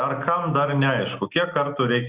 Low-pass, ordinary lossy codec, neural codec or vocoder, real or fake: 3.6 kHz; Opus, 64 kbps; none; real